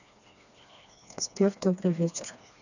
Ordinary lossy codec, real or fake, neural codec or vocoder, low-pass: none; fake; codec, 16 kHz, 2 kbps, FreqCodec, smaller model; 7.2 kHz